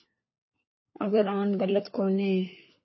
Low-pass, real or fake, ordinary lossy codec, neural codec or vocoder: 7.2 kHz; fake; MP3, 24 kbps; codec, 16 kHz, 4 kbps, FunCodec, trained on LibriTTS, 50 frames a second